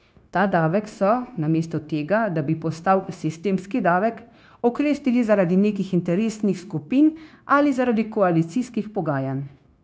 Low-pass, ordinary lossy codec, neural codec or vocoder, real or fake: none; none; codec, 16 kHz, 0.9 kbps, LongCat-Audio-Codec; fake